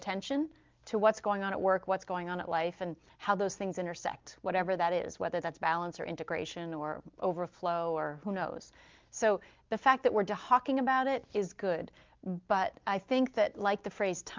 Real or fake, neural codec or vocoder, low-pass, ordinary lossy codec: real; none; 7.2 kHz; Opus, 32 kbps